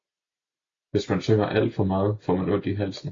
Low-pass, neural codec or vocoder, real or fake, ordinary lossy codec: 7.2 kHz; none; real; AAC, 32 kbps